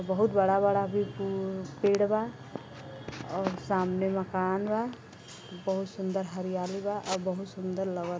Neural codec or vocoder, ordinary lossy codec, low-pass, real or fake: none; none; none; real